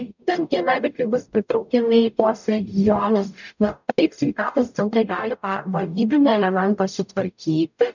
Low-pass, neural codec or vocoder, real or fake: 7.2 kHz; codec, 44.1 kHz, 0.9 kbps, DAC; fake